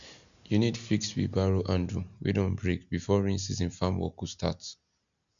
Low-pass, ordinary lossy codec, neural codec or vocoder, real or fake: 7.2 kHz; none; none; real